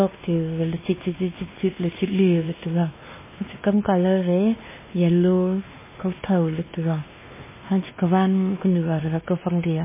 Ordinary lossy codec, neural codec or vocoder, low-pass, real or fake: MP3, 16 kbps; codec, 16 kHz, 2 kbps, X-Codec, WavLM features, trained on Multilingual LibriSpeech; 3.6 kHz; fake